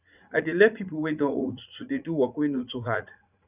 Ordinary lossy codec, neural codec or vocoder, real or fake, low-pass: none; vocoder, 44.1 kHz, 80 mel bands, Vocos; fake; 3.6 kHz